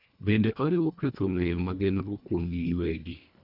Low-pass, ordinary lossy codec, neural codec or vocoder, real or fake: 5.4 kHz; none; codec, 24 kHz, 1.5 kbps, HILCodec; fake